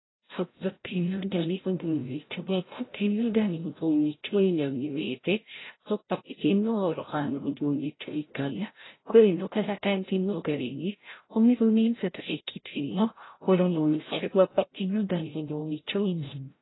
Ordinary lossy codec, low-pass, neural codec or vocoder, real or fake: AAC, 16 kbps; 7.2 kHz; codec, 16 kHz, 0.5 kbps, FreqCodec, larger model; fake